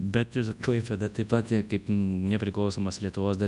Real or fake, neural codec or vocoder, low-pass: fake; codec, 24 kHz, 0.9 kbps, WavTokenizer, large speech release; 10.8 kHz